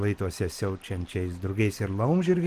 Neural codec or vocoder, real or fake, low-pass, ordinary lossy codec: none; real; 14.4 kHz; Opus, 24 kbps